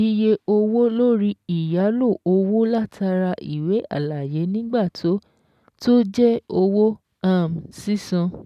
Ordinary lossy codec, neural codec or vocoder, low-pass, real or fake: none; none; 14.4 kHz; real